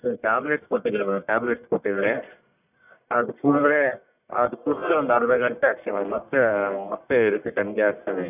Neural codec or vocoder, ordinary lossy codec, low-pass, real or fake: codec, 44.1 kHz, 1.7 kbps, Pupu-Codec; none; 3.6 kHz; fake